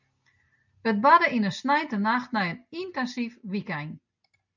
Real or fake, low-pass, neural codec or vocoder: real; 7.2 kHz; none